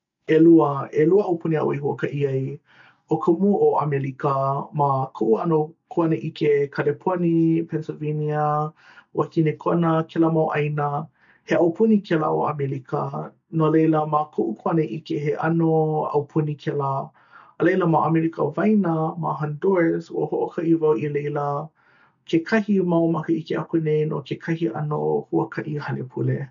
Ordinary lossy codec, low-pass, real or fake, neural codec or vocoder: MP3, 64 kbps; 7.2 kHz; real; none